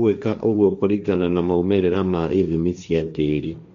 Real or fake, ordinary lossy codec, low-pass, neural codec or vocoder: fake; none; 7.2 kHz; codec, 16 kHz, 1.1 kbps, Voila-Tokenizer